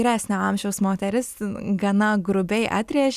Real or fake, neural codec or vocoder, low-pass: real; none; 14.4 kHz